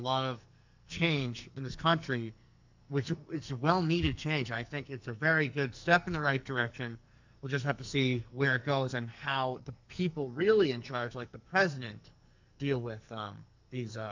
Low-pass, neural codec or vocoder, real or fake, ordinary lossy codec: 7.2 kHz; codec, 44.1 kHz, 2.6 kbps, SNAC; fake; MP3, 64 kbps